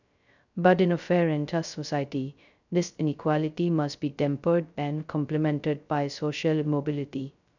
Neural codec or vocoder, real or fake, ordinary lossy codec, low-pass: codec, 16 kHz, 0.2 kbps, FocalCodec; fake; none; 7.2 kHz